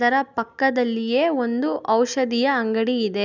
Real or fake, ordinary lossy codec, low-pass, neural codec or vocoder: real; none; 7.2 kHz; none